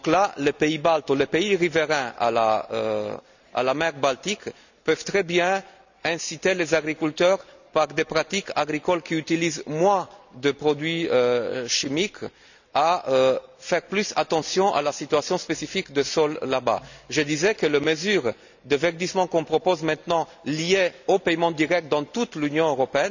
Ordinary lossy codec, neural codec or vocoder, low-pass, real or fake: none; none; 7.2 kHz; real